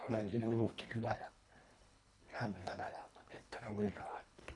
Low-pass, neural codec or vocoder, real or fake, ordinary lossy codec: 10.8 kHz; codec, 24 kHz, 1.5 kbps, HILCodec; fake; none